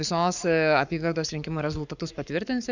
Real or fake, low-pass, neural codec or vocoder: fake; 7.2 kHz; codec, 44.1 kHz, 7.8 kbps, Pupu-Codec